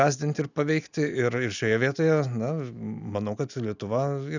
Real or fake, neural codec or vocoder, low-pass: real; none; 7.2 kHz